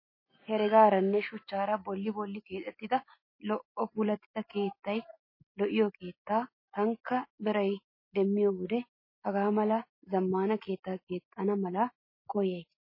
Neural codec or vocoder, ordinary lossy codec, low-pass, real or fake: none; MP3, 24 kbps; 5.4 kHz; real